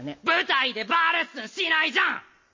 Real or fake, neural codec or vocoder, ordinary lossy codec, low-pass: real; none; MP3, 32 kbps; 7.2 kHz